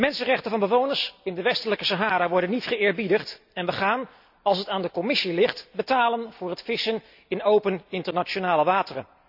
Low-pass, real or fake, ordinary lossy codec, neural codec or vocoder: 5.4 kHz; real; none; none